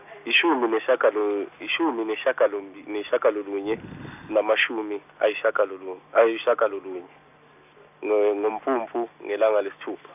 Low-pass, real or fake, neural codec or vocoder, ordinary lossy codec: 3.6 kHz; real; none; none